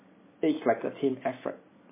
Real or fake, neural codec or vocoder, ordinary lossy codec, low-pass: real; none; MP3, 16 kbps; 3.6 kHz